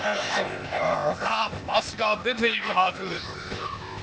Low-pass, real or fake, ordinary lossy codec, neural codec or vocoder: none; fake; none; codec, 16 kHz, 0.8 kbps, ZipCodec